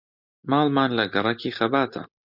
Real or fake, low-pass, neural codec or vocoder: real; 5.4 kHz; none